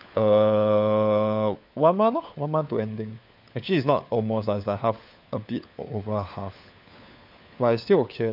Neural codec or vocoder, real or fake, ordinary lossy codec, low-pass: codec, 16 kHz, 4 kbps, FunCodec, trained on LibriTTS, 50 frames a second; fake; none; 5.4 kHz